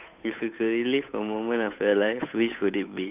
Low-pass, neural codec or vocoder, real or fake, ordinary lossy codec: 3.6 kHz; codec, 16 kHz, 8 kbps, FunCodec, trained on Chinese and English, 25 frames a second; fake; none